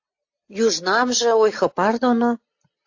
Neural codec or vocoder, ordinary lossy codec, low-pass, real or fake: none; AAC, 48 kbps; 7.2 kHz; real